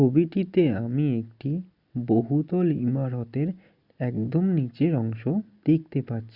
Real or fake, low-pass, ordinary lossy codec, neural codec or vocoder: real; 5.4 kHz; Opus, 64 kbps; none